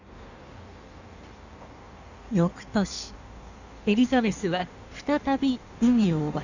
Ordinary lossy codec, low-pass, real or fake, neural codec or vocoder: none; 7.2 kHz; fake; codec, 16 kHz in and 24 kHz out, 1.1 kbps, FireRedTTS-2 codec